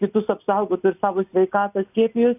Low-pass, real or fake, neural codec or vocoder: 3.6 kHz; real; none